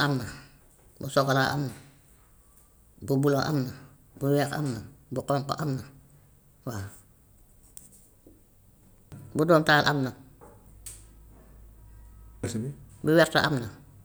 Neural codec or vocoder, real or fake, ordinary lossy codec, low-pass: none; real; none; none